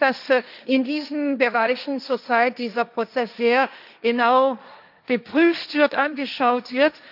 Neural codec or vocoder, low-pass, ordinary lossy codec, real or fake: codec, 16 kHz, 1.1 kbps, Voila-Tokenizer; 5.4 kHz; none; fake